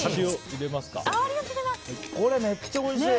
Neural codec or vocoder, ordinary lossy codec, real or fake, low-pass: none; none; real; none